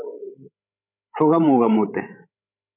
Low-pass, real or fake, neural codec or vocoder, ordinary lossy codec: 3.6 kHz; fake; codec, 16 kHz, 16 kbps, FreqCodec, larger model; MP3, 32 kbps